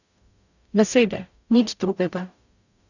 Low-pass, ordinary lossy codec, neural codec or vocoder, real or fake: 7.2 kHz; none; codec, 44.1 kHz, 0.9 kbps, DAC; fake